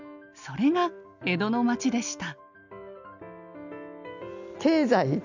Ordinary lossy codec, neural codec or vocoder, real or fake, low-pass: none; none; real; 7.2 kHz